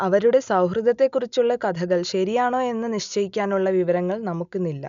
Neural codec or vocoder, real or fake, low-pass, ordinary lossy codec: none; real; 7.2 kHz; none